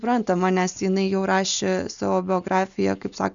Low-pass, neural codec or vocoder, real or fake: 7.2 kHz; none; real